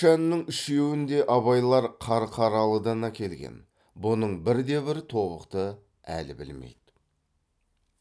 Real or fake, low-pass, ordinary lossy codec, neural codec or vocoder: real; none; none; none